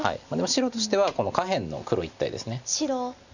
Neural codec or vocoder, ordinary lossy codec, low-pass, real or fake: none; none; 7.2 kHz; real